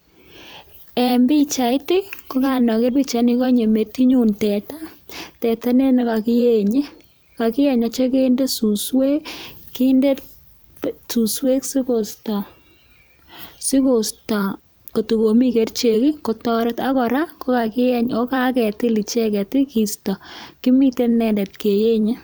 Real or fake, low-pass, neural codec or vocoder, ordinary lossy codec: fake; none; vocoder, 44.1 kHz, 128 mel bands every 512 samples, BigVGAN v2; none